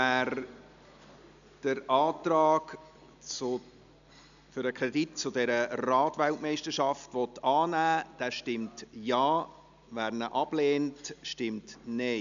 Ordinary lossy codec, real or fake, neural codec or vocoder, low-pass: none; real; none; 7.2 kHz